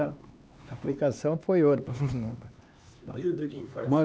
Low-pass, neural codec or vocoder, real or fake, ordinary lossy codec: none; codec, 16 kHz, 2 kbps, X-Codec, HuBERT features, trained on LibriSpeech; fake; none